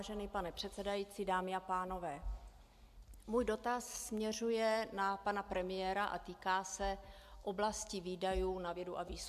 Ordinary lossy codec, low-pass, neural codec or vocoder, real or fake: AAC, 96 kbps; 14.4 kHz; none; real